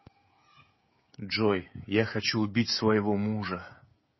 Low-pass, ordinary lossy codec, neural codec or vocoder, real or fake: 7.2 kHz; MP3, 24 kbps; codec, 16 kHz, 16 kbps, FreqCodec, smaller model; fake